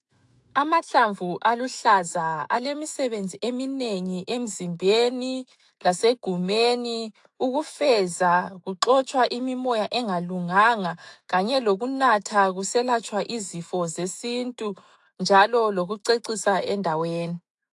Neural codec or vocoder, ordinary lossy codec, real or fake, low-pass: autoencoder, 48 kHz, 128 numbers a frame, DAC-VAE, trained on Japanese speech; AAC, 64 kbps; fake; 10.8 kHz